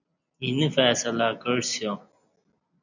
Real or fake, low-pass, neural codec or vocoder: real; 7.2 kHz; none